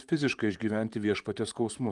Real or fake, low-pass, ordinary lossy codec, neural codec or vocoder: fake; 10.8 kHz; Opus, 32 kbps; vocoder, 44.1 kHz, 128 mel bands, Pupu-Vocoder